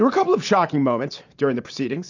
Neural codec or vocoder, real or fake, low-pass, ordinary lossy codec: vocoder, 44.1 kHz, 128 mel bands every 512 samples, BigVGAN v2; fake; 7.2 kHz; AAC, 48 kbps